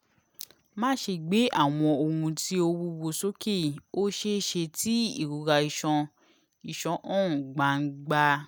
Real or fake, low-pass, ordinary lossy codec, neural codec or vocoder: real; none; none; none